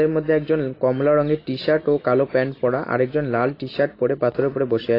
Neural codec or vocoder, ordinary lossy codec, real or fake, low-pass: none; AAC, 24 kbps; real; 5.4 kHz